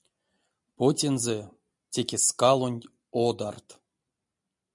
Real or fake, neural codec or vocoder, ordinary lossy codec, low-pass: real; none; MP3, 96 kbps; 10.8 kHz